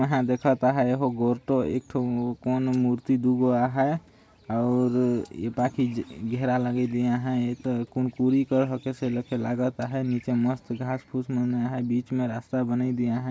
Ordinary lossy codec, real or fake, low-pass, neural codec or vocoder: none; real; none; none